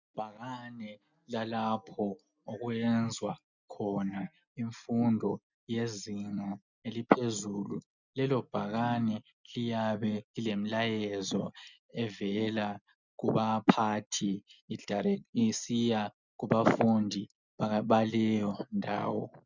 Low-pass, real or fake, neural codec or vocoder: 7.2 kHz; real; none